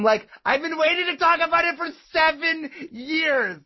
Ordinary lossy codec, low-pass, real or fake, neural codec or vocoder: MP3, 24 kbps; 7.2 kHz; real; none